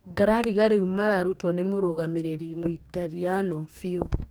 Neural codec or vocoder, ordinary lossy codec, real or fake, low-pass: codec, 44.1 kHz, 2.6 kbps, DAC; none; fake; none